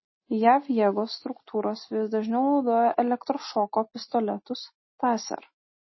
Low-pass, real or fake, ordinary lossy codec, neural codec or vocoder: 7.2 kHz; real; MP3, 24 kbps; none